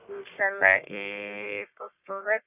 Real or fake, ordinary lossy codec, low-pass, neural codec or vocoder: fake; none; 3.6 kHz; codec, 44.1 kHz, 1.7 kbps, Pupu-Codec